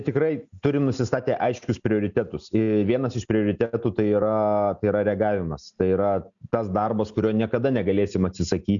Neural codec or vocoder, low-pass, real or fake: none; 7.2 kHz; real